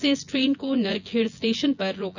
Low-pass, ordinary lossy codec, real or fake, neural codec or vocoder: 7.2 kHz; none; fake; vocoder, 24 kHz, 100 mel bands, Vocos